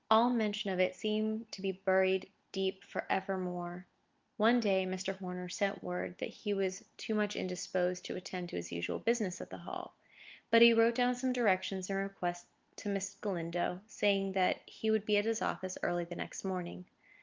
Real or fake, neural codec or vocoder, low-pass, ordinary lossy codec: real; none; 7.2 kHz; Opus, 32 kbps